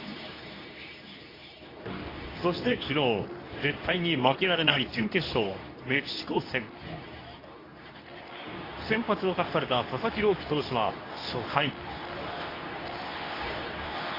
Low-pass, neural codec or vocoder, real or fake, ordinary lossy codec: 5.4 kHz; codec, 24 kHz, 0.9 kbps, WavTokenizer, medium speech release version 2; fake; AAC, 24 kbps